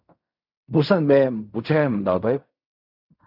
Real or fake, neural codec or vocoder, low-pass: fake; codec, 16 kHz in and 24 kHz out, 0.4 kbps, LongCat-Audio-Codec, fine tuned four codebook decoder; 5.4 kHz